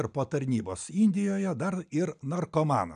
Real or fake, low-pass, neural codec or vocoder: real; 9.9 kHz; none